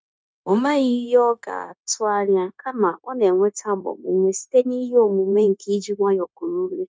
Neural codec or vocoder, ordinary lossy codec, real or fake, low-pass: codec, 16 kHz, 0.9 kbps, LongCat-Audio-Codec; none; fake; none